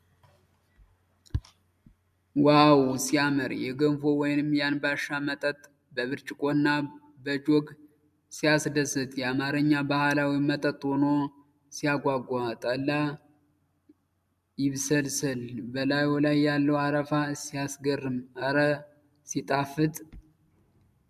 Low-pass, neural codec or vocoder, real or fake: 14.4 kHz; none; real